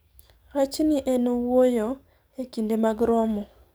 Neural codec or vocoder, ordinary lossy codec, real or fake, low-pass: codec, 44.1 kHz, 7.8 kbps, DAC; none; fake; none